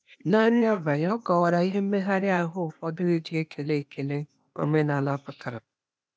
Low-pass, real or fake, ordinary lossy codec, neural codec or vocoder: none; fake; none; codec, 16 kHz, 0.8 kbps, ZipCodec